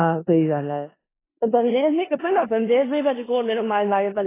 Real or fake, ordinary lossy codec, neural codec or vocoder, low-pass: fake; AAC, 16 kbps; codec, 16 kHz in and 24 kHz out, 0.4 kbps, LongCat-Audio-Codec, four codebook decoder; 3.6 kHz